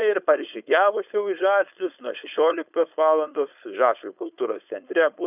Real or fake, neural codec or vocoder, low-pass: fake; codec, 16 kHz, 4.8 kbps, FACodec; 3.6 kHz